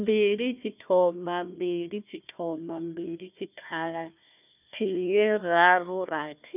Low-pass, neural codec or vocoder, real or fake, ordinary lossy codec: 3.6 kHz; codec, 16 kHz, 1 kbps, FunCodec, trained on Chinese and English, 50 frames a second; fake; none